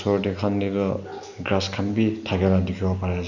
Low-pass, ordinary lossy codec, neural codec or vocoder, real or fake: 7.2 kHz; none; none; real